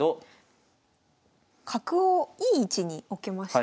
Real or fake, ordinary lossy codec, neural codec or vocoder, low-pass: real; none; none; none